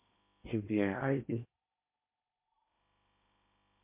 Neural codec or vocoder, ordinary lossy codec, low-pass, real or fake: codec, 16 kHz in and 24 kHz out, 0.8 kbps, FocalCodec, streaming, 65536 codes; MP3, 24 kbps; 3.6 kHz; fake